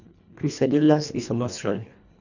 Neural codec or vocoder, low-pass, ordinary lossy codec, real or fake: codec, 24 kHz, 1.5 kbps, HILCodec; 7.2 kHz; none; fake